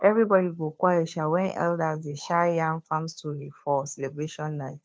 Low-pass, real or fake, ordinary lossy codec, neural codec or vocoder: none; fake; none; codec, 16 kHz, 2 kbps, FunCodec, trained on Chinese and English, 25 frames a second